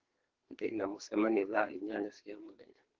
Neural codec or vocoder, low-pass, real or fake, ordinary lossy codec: codec, 44.1 kHz, 2.6 kbps, SNAC; 7.2 kHz; fake; Opus, 16 kbps